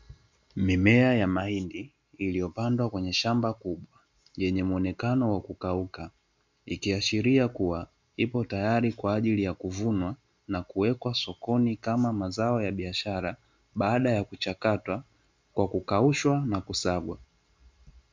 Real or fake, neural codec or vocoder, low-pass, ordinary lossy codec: real; none; 7.2 kHz; MP3, 64 kbps